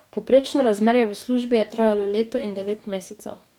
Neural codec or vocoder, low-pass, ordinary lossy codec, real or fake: codec, 44.1 kHz, 2.6 kbps, DAC; 19.8 kHz; none; fake